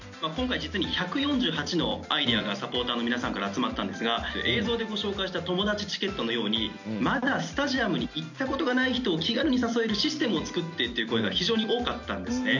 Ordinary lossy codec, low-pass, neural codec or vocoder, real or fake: none; 7.2 kHz; none; real